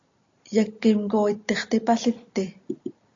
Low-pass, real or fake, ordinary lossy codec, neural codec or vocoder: 7.2 kHz; real; AAC, 48 kbps; none